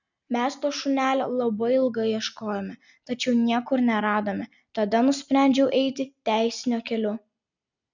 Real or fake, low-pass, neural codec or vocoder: real; 7.2 kHz; none